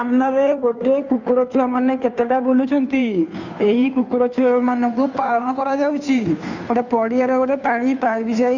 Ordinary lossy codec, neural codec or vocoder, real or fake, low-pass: none; codec, 16 kHz, 2 kbps, FunCodec, trained on Chinese and English, 25 frames a second; fake; 7.2 kHz